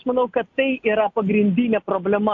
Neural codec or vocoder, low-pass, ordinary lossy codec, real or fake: none; 7.2 kHz; AAC, 48 kbps; real